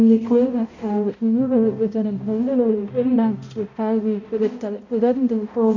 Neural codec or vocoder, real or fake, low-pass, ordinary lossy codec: codec, 16 kHz, 0.5 kbps, X-Codec, HuBERT features, trained on balanced general audio; fake; 7.2 kHz; none